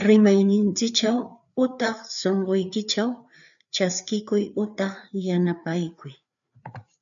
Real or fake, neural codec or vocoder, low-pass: fake; codec, 16 kHz, 4 kbps, FreqCodec, larger model; 7.2 kHz